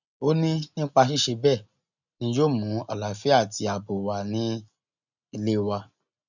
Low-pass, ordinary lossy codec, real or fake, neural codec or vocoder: 7.2 kHz; none; real; none